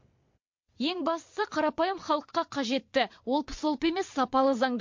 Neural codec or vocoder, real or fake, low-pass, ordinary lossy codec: vocoder, 22.05 kHz, 80 mel bands, WaveNeXt; fake; 7.2 kHz; MP3, 48 kbps